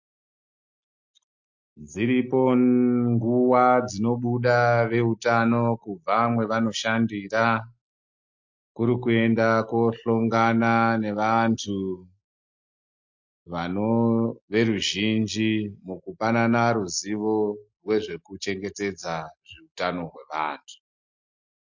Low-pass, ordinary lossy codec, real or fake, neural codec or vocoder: 7.2 kHz; MP3, 48 kbps; real; none